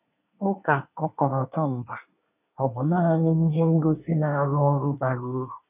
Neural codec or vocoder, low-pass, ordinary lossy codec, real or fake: codec, 24 kHz, 1 kbps, SNAC; 3.6 kHz; none; fake